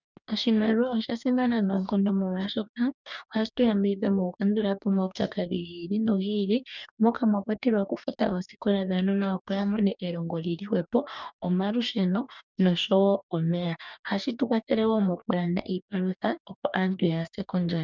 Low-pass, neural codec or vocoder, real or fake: 7.2 kHz; codec, 44.1 kHz, 2.6 kbps, DAC; fake